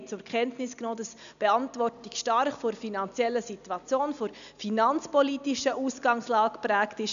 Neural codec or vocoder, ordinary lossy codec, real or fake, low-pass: none; none; real; 7.2 kHz